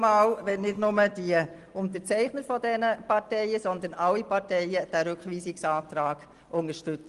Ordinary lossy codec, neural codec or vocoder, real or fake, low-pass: Opus, 24 kbps; none; real; 10.8 kHz